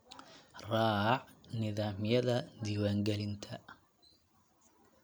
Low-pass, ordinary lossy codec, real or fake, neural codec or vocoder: none; none; real; none